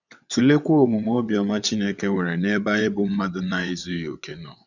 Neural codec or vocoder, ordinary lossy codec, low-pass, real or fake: vocoder, 22.05 kHz, 80 mel bands, WaveNeXt; none; 7.2 kHz; fake